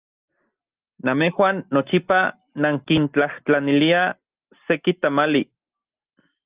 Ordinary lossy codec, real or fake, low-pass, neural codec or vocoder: Opus, 24 kbps; real; 3.6 kHz; none